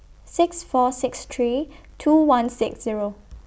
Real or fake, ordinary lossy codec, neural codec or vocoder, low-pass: real; none; none; none